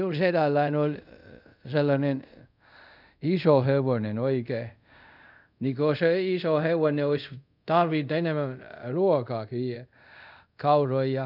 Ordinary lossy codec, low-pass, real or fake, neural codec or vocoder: none; 5.4 kHz; fake; codec, 24 kHz, 0.5 kbps, DualCodec